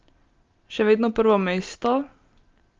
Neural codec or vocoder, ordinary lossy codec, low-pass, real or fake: none; Opus, 32 kbps; 7.2 kHz; real